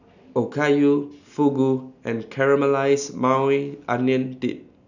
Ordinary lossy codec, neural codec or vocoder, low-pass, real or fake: none; none; 7.2 kHz; real